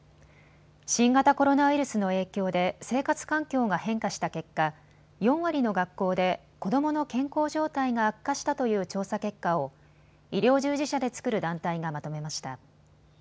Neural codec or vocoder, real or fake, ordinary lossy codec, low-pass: none; real; none; none